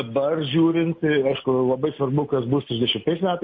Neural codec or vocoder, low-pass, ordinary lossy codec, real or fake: none; 7.2 kHz; MP3, 32 kbps; real